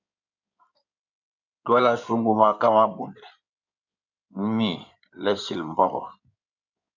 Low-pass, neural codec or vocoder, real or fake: 7.2 kHz; codec, 16 kHz in and 24 kHz out, 2.2 kbps, FireRedTTS-2 codec; fake